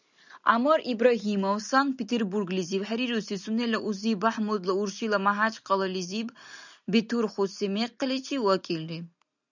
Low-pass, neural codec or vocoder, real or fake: 7.2 kHz; none; real